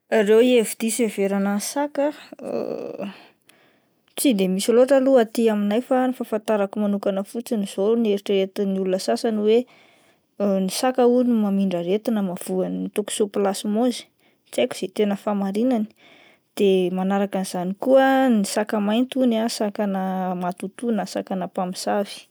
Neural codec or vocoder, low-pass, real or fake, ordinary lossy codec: none; none; real; none